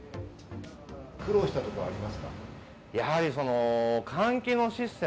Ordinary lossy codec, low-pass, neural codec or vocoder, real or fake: none; none; none; real